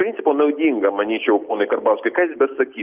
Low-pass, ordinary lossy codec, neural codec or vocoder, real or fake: 3.6 kHz; Opus, 24 kbps; none; real